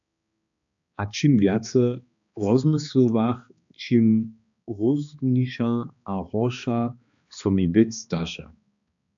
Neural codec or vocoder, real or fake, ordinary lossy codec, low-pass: codec, 16 kHz, 2 kbps, X-Codec, HuBERT features, trained on balanced general audio; fake; MP3, 64 kbps; 7.2 kHz